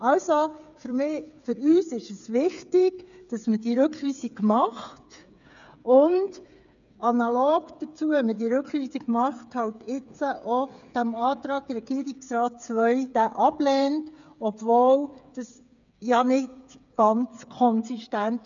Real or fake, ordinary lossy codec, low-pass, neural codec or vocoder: fake; none; 7.2 kHz; codec, 16 kHz, 8 kbps, FreqCodec, smaller model